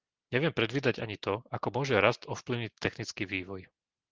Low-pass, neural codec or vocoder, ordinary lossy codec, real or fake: 7.2 kHz; none; Opus, 16 kbps; real